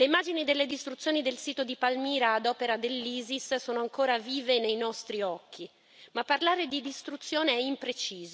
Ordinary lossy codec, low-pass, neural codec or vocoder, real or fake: none; none; none; real